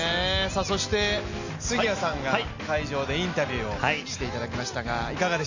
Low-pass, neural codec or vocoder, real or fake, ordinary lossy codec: 7.2 kHz; none; real; none